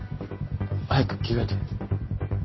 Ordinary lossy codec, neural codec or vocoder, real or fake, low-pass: MP3, 24 kbps; none; real; 7.2 kHz